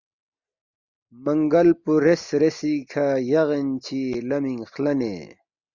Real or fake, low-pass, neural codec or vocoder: real; 7.2 kHz; none